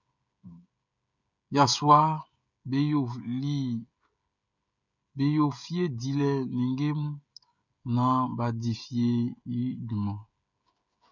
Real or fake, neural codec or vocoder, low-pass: fake; codec, 16 kHz, 16 kbps, FreqCodec, smaller model; 7.2 kHz